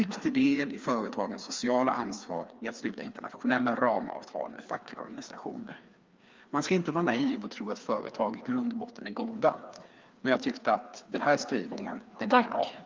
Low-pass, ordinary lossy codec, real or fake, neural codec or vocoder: 7.2 kHz; Opus, 32 kbps; fake; codec, 16 kHz, 2 kbps, FreqCodec, larger model